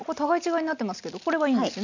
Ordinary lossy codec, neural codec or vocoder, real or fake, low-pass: none; none; real; 7.2 kHz